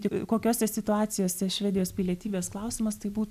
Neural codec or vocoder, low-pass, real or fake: none; 14.4 kHz; real